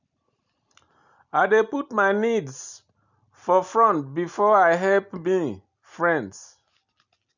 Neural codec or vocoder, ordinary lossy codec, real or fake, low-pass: none; none; real; 7.2 kHz